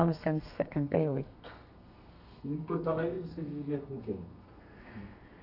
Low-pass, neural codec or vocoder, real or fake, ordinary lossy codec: 5.4 kHz; codec, 32 kHz, 1.9 kbps, SNAC; fake; none